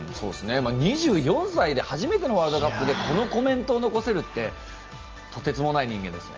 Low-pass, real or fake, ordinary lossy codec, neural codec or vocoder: 7.2 kHz; real; Opus, 24 kbps; none